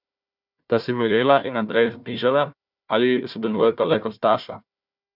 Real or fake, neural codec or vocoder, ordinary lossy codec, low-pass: fake; codec, 16 kHz, 1 kbps, FunCodec, trained on Chinese and English, 50 frames a second; none; 5.4 kHz